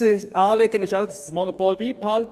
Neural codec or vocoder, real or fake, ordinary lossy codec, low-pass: codec, 44.1 kHz, 2.6 kbps, DAC; fake; Opus, 64 kbps; 14.4 kHz